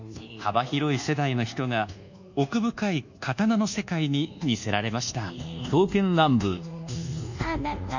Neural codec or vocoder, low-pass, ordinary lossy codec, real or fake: codec, 24 kHz, 1.2 kbps, DualCodec; 7.2 kHz; none; fake